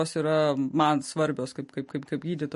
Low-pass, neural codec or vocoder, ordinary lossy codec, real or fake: 14.4 kHz; none; MP3, 48 kbps; real